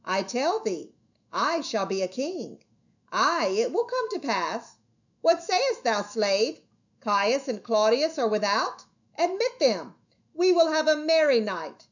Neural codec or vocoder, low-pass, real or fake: autoencoder, 48 kHz, 128 numbers a frame, DAC-VAE, trained on Japanese speech; 7.2 kHz; fake